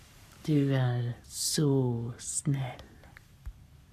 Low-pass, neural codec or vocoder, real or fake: 14.4 kHz; codec, 44.1 kHz, 7.8 kbps, Pupu-Codec; fake